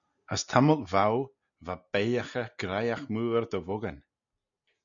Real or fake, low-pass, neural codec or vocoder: real; 7.2 kHz; none